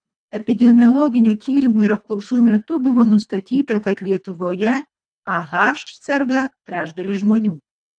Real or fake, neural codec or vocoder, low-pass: fake; codec, 24 kHz, 1.5 kbps, HILCodec; 9.9 kHz